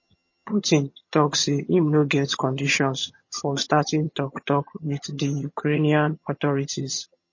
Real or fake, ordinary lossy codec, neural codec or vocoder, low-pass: fake; MP3, 32 kbps; vocoder, 22.05 kHz, 80 mel bands, HiFi-GAN; 7.2 kHz